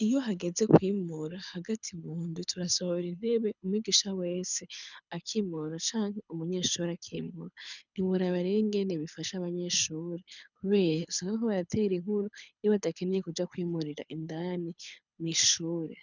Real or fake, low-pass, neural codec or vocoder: fake; 7.2 kHz; codec, 24 kHz, 6 kbps, HILCodec